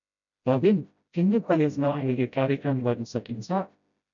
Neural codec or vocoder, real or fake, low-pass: codec, 16 kHz, 0.5 kbps, FreqCodec, smaller model; fake; 7.2 kHz